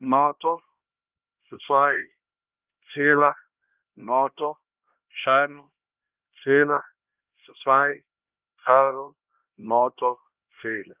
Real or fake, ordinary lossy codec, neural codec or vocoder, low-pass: fake; Opus, 24 kbps; codec, 16 kHz, 1 kbps, X-Codec, HuBERT features, trained on LibriSpeech; 3.6 kHz